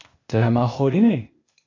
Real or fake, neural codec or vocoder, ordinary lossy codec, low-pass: fake; codec, 16 kHz, 0.8 kbps, ZipCodec; AAC, 48 kbps; 7.2 kHz